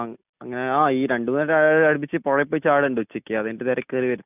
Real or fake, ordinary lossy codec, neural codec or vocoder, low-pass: real; none; none; 3.6 kHz